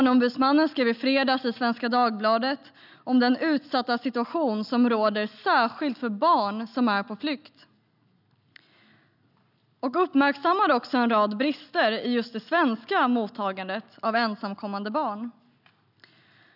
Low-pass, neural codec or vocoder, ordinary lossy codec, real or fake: 5.4 kHz; none; none; real